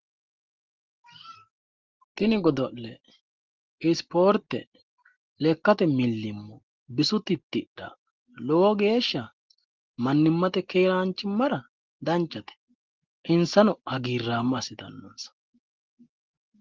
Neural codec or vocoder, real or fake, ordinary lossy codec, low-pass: none; real; Opus, 16 kbps; 7.2 kHz